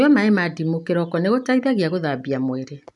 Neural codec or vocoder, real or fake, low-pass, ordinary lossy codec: none; real; 10.8 kHz; none